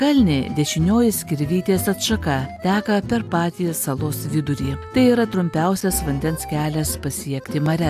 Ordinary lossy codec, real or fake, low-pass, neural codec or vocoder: AAC, 96 kbps; real; 14.4 kHz; none